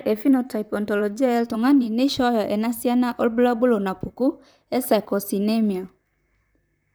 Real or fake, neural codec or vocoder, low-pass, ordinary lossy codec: fake; vocoder, 44.1 kHz, 128 mel bands, Pupu-Vocoder; none; none